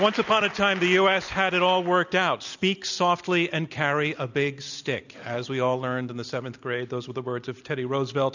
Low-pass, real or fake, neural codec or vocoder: 7.2 kHz; real; none